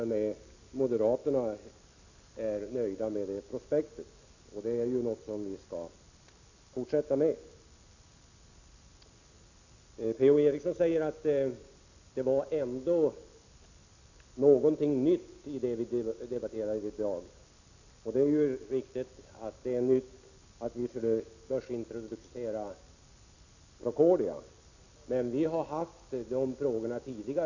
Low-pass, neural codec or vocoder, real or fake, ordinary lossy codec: 7.2 kHz; none; real; none